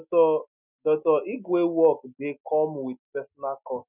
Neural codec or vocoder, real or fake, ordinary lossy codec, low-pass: none; real; none; 3.6 kHz